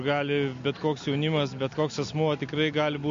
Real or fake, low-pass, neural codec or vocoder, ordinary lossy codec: real; 7.2 kHz; none; MP3, 48 kbps